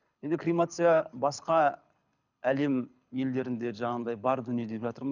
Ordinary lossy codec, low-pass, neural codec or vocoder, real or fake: none; 7.2 kHz; codec, 24 kHz, 6 kbps, HILCodec; fake